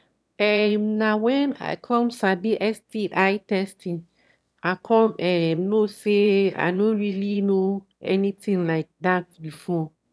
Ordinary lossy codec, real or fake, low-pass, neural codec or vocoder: none; fake; none; autoencoder, 22.05 kHz, a latent of 192 numbers a frame, VITS, trained on one speaker